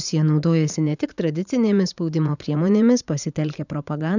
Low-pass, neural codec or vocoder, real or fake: 7.2 kHz; vocoder, 44.1 kHz, 80 mel bands, Vocos; fake